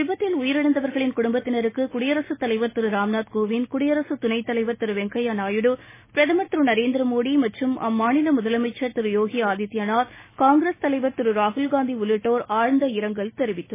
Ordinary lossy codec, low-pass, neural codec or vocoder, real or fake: MP3, 16 kbps; 3.6 kHz; none; real